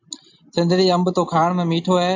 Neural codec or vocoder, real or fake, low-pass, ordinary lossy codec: none; real; 7.2 kHz; AAC, 48 kbps